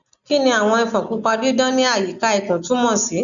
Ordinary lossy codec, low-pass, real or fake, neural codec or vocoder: none; 7.2 kHz; real; none